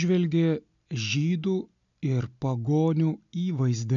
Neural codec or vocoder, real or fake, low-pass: none; real; 7.2 kHz